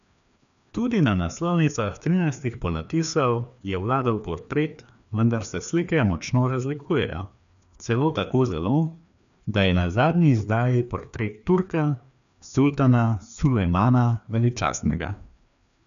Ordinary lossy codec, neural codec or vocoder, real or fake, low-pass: none; codec, 16 kHz, 2 kbps, FreqCodec, larger model; fake; 7.2 kHz